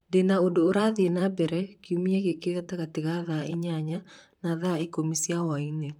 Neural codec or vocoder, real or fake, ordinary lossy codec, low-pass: vocoder, 44.1 kHz, 128 mel bands, Pupu-Vocoder; fake; none; 19.8 kHz